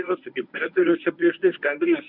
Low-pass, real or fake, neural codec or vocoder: 5.4 kHz; fake; codec, 24 kHz, 0.9 kbps, WavTokenizer, medium speech release version 1